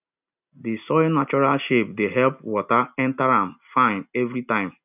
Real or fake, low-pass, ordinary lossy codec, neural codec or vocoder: real; 3.6 kHz; none; none